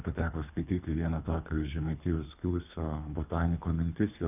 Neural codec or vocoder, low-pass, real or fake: codec, 24 kHz, 3 kbps, HILCodec; 3.6 kHz; fake